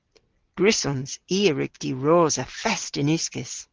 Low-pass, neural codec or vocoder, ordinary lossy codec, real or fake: 7.2 kHz; none; Opus, 16 kbps; real